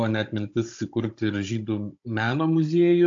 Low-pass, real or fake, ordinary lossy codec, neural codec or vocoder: 7.2 kHz; fake; AAC, 48 kbps; codec, 16 kHz, 16 kbps, FunCodec, trained on Chinese and English, 50 frames a second